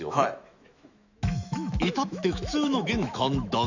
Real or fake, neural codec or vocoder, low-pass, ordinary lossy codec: fake; autoencoder, 48 kHz, 128 numbers a frame, DAC-VAE, trained on Japanese speech; 7.2 kHz; none